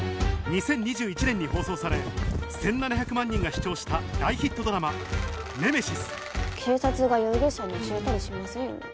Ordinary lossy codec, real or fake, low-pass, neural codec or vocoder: none; real; none; none